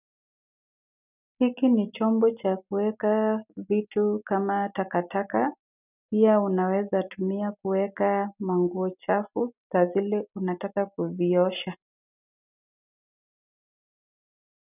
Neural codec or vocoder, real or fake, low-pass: none; real; 3.6 kHz